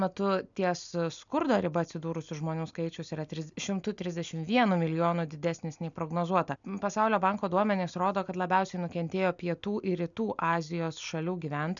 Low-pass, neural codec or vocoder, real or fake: 7.2 kHz; none; real